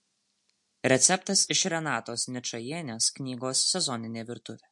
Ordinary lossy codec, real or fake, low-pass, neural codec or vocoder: MP3, 48 kbps; real; 10.8 kHz; none